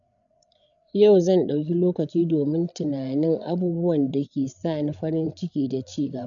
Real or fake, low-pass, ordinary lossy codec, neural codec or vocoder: fake; 7.2 kHz; none; codec, 16 kHz, 8 kbps, FreqCodec, larger model